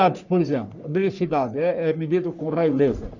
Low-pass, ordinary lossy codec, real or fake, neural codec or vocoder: 7.2 kHz; none; fake; codec, 44.1 kHz, 3.4 kbps, Pupu-Codec